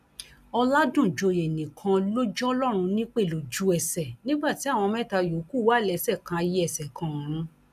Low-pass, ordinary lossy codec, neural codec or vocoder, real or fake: 14.4 kHz; none; none; real